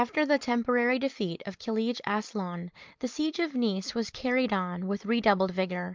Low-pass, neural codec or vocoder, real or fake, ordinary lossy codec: 7.2 kHz; none; real; Opus, 24 kbps